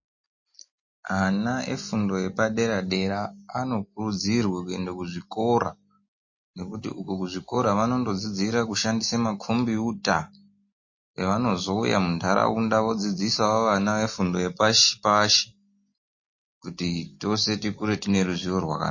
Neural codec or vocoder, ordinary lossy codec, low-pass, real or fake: none; MP3, 32 kbps; 7.2 kHz; real